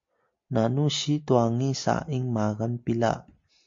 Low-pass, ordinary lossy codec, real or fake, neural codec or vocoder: 7.2 kHz; MP3, 48 kbps; real; none